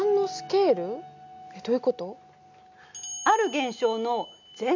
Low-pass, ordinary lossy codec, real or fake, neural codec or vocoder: 7.2 kHz; none; real; none